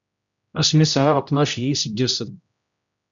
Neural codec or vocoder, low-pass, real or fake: codec, 16 kHz, 0.5 kbps, X-Codec, HuBERT features, trained on general audio; 7.2 kHz; fake